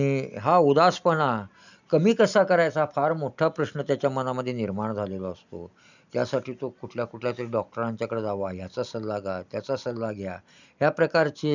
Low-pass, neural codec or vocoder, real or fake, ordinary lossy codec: 7.2 kHz; none; real; none